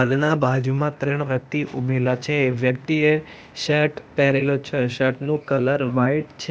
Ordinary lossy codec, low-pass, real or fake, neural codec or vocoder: none; none; fake; codec, 16 kHz, 0.8 kbps, ZipCodec